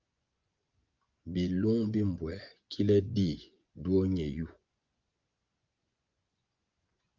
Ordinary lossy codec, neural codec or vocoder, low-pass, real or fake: Opus, 32 kbps; vocoder, 24 kHz, 100 mel bands, Vocos; 7.2 kHz; fake